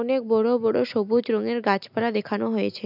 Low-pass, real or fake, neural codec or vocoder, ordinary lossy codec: 5.4 kHz; real; none; none